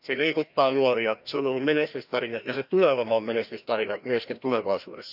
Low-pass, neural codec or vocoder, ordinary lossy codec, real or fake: 5.4 kHz; codec, 16 kHz, 1 kbps, FreqCodec, larger model; none; fake